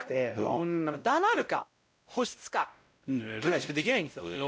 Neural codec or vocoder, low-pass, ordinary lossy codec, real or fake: codec, 16 kHz, 0.5 kbps, X-Codec, WavLM features, trained on Multilingual LibriSpeech; none; none; fake